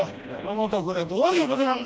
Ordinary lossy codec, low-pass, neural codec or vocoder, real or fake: none; none; codec, 16 kHz, 1 kbps, FreqCodec, smaller model; fake